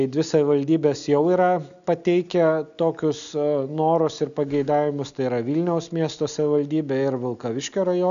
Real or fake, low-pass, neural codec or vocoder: real; 7.2 kHz; none